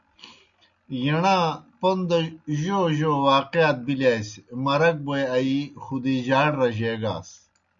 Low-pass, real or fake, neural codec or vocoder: 7.2 kHz; real; none